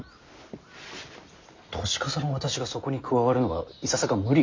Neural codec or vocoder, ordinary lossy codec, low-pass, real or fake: none; MP3, 64 kbps; 7.2 kHz; real